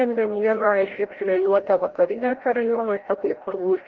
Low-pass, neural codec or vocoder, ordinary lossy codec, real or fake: 7.2 kHz; codec, 16 kHz, 0.5 kbps, FreqCodec, larger model; Opus, 16 kbps; fake